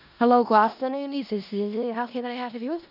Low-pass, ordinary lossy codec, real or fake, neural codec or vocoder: 5.4 kHz; none; fake; codec, 16 kHz in and 24 kHz out, 0.4 kbps, LongCat-Audio-Codec, four codebook decoder